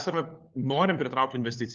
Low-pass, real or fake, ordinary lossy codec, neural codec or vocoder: 7.2 kHz; fake; Opus, 24 kbps; codec, 16 kHz, 4 kbps, FunCodec, trained on LibriTTS, 50 frames a second